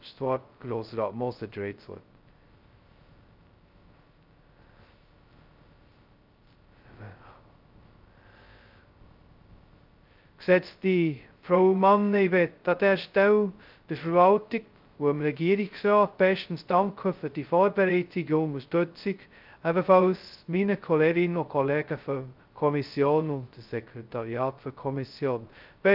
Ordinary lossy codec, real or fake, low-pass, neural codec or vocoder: Opus, 24 kbps; fake; 5.4 kHz; codec, 16 kHz, 0.2 kbps, FocalCodec